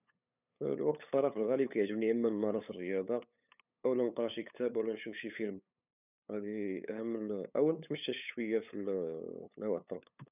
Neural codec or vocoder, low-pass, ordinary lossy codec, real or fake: codec, 16 kHz, 8 kbps, FunCodec, trained on LibriTTS, 25 frames a second; 3.6 kHz; none; fake